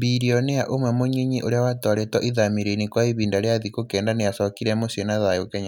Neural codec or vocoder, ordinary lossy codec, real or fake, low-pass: none; none; real; 19.8 kHz